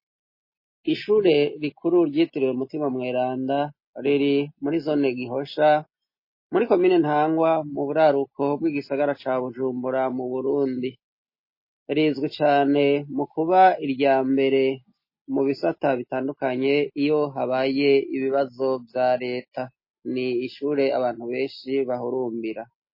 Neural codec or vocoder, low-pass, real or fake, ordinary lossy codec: none; 5.4 kHz; real; MP3, 24 kbps